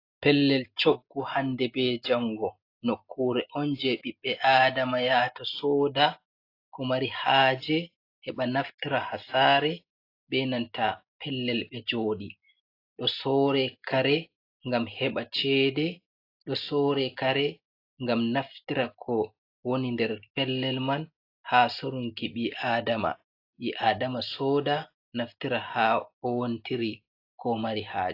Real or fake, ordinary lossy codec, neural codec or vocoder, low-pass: real; AAC, 32 kbps; none; 5.4 kHz